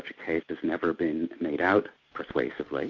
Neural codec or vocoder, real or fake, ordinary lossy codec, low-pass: none; real; MP3, 48 kbps; 7.2 kHz